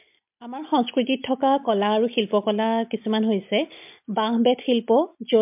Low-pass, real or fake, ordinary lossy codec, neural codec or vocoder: 3.6 kHz; real; MP3, 32 kbps; none